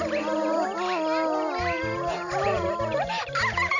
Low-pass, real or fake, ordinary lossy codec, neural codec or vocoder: 7.2 kHz; real; none; none